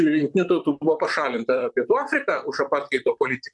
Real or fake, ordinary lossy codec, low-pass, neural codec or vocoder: fake; MP3, 96 kbps; 10.8 kHz; vocoder, 44.1 kHz, 128 mel bands, Pupu-Vocoder